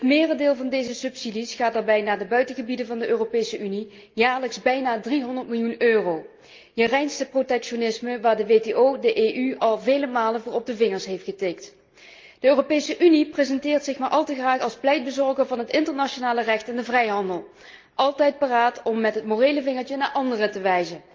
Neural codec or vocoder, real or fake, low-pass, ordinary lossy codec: none; real; 7.2 kHz; Opus, 24 kbps